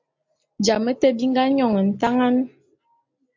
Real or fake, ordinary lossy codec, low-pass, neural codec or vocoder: real; MP3, 48 kbps; 7.2 kHz; none